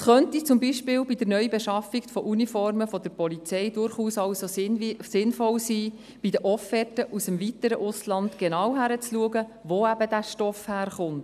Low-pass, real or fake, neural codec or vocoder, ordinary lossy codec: 14.4 kHz; real; none; none